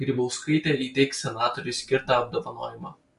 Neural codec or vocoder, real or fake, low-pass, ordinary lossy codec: none; real; 10.8 kHz; MP3, 64 kbps